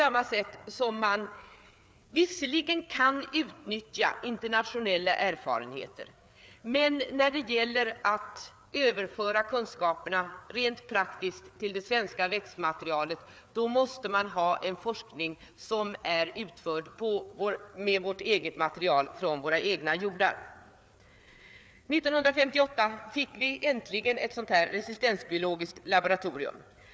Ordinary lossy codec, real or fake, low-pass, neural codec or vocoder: none; fake; none; codec, 16 kHz, 4 kbps, FreqCodec, larger model